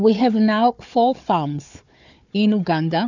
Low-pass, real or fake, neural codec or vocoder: 7.2 kHz; fake; codec, 16 kHz, 4 kbps, FunCodec, trained on Chinese and English, 50 frames a second